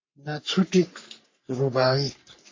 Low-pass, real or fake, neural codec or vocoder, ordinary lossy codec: 7.2 kHz; fake; codec, 44.1 kHz, 3.4 kbps, Pupu-Codec; MP3, 32 kbps